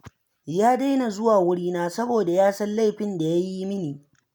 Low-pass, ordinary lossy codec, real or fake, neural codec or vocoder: none; none; real; none